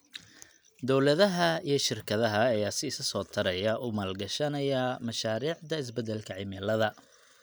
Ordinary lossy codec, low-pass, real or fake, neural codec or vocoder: none; none; real; none